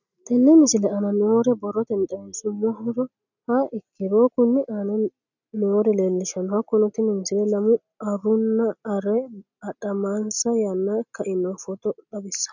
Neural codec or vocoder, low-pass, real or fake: none; 7.2 kHz; real